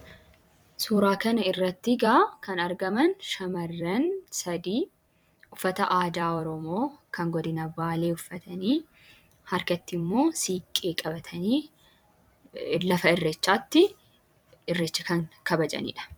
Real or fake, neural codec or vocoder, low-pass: fake; vocoder, 44.1 kHz, 128 mel bands every 256 samples, BigVGAN v2; 19.8 kHz